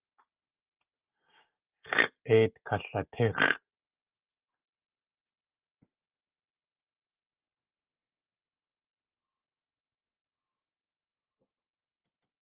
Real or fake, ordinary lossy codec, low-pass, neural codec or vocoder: real; Opus, 24 kbps; 3.6 kHz; none